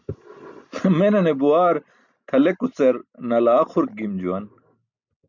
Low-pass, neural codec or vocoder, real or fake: 7.2 kHz; none; real